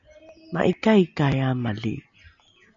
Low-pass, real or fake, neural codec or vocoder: 7.2 kHz; real; none